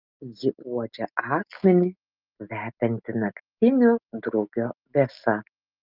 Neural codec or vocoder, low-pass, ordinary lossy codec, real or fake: none; 5.4 kHz; Opus, 24 kbps; real